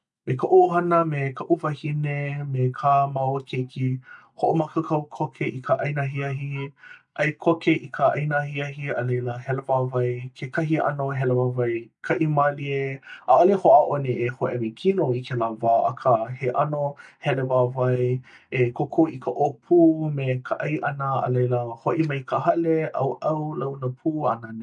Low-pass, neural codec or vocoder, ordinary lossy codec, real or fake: 10.8 kHz; none; none; real